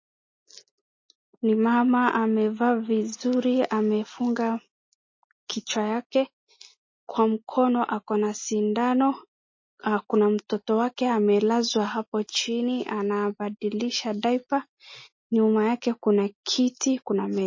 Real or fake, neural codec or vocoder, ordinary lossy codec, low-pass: real; none; MP3, 32 kbps; 7.2 kHz